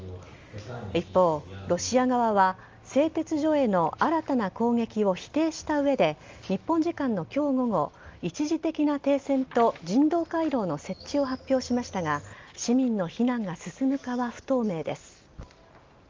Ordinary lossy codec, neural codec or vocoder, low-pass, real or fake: Opus, 32 kbps; none; 7.2 kHz; real